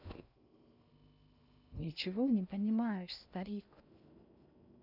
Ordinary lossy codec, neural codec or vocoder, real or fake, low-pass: none; codec, 16 kHz in and 24 kHz out, 0.6 kbps, FocalCodec, streaming, 2048 codes; fake; 5.4 kHz